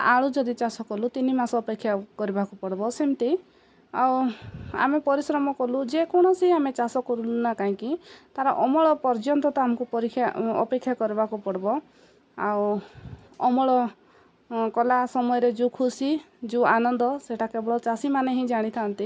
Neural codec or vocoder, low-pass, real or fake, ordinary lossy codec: none; none; real; none